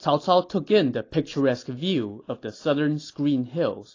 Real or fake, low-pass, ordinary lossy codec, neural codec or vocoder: real; 7.2 kHz; AAC, 32 kbps; none